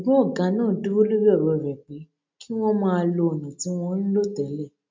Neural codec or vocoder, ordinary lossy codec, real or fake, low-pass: none; MP3, 64 kbps; real; 7.2 kHz